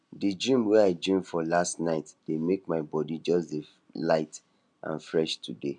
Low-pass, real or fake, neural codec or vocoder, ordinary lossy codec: 9.9 kHz; real; none; none